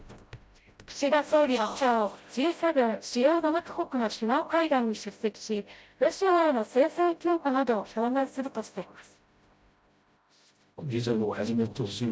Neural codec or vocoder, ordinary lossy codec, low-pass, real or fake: codec, 16 kHz, 0.5 kbps, FreqCodec, smaller model; none; none; fake